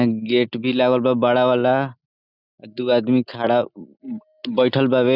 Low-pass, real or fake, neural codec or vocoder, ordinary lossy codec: 5.4 kHz; real; none; none